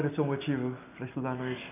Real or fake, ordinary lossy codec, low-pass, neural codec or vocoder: real; none; 3.6 kHz; none